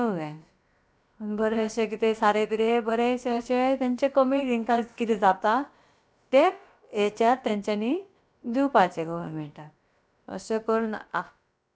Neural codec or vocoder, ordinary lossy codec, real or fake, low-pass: codec, 16 kHz, about 1 kbps, DyCAST, with the encoder's durations; none; fake; none